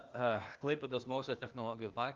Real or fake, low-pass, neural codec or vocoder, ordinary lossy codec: fake; 7.2 kHz; codec, 16 kHz, 0.8 kbps, ZipCodec; Opus, 24 kbps